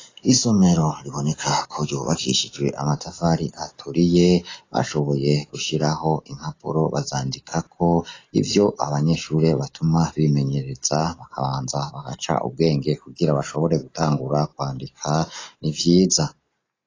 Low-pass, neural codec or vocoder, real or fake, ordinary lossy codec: 7.2 kHz; none; real; AAC, 32 kbps